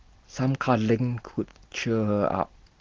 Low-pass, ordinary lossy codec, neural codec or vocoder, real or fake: 7.2 kHz; Opus, 16 kbps; none; real